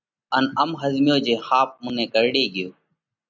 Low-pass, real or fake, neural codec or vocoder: 7.2 kHz; real; none